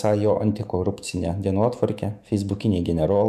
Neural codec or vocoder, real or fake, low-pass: autoencoder, 48 kHz, 128 numbers a frame, DAC-VAE, trained on Japanese speech; fake; 14.4 kHz